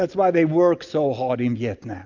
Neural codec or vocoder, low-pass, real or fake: none; 7.2 kHz; real